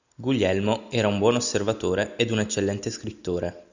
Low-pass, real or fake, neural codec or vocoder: 7.2 kHz; real; none